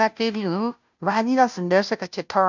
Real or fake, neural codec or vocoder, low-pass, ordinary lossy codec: fake; codec, 16 kHz, 0.5 kbps, FunCodec, trained on Chinese and English, 25 frames a second; 7.2 kHz; none